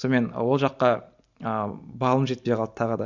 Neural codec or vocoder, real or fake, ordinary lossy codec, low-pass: none; real; none; 7.2 kHz